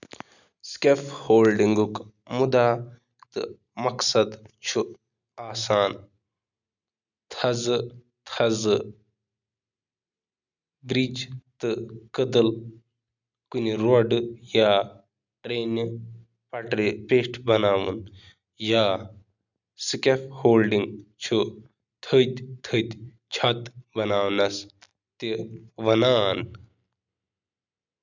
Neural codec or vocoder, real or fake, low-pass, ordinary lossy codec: none; real; 7.2 kHz; none